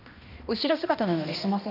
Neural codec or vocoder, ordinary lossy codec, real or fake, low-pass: codec, 16 kHz, 1 kbps, X-Codec, HuBERT features, trained on balanced general audio; none; fake; 5.4 kHz